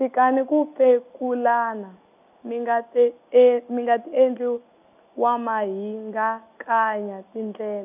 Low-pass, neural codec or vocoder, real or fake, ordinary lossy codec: 3.6 kHz; none; real; none